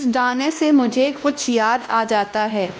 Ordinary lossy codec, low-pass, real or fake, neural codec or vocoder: none; none; fake; codec, 16 kHz, 1 kbps, X-Codec, WavLM features, trained on Multilingual LibriSpeech